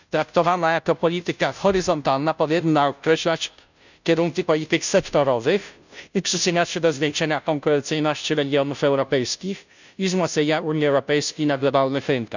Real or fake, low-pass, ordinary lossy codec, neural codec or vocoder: fake; 7.2 kHz; none; codec, 16 kHz, 0.5 kbps, FunCodec, trained on Chinese and English, 25 frames a second